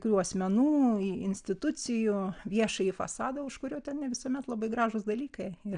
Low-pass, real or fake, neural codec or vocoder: 9.9 kHz; real; none